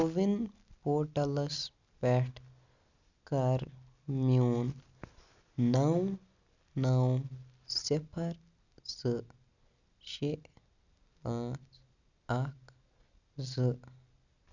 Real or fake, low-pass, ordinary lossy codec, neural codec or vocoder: real; 7.2 kHz; none; none